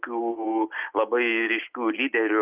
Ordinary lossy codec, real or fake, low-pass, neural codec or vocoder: Opus, 32 kbps; real; 3.6 kHz; none